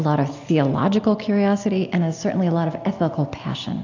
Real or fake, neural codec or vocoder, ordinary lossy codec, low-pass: real; none; AAC, 48 kbps; 7.2 kHz